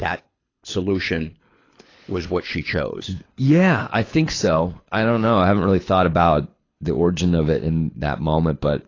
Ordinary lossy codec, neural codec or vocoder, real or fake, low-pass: AAC, 32 kbps; codec, 24 kHz, 6 kbps, HILCodec; fake; 7.2 kHz